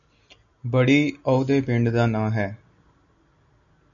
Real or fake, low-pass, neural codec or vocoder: real; 7.2 kHz; none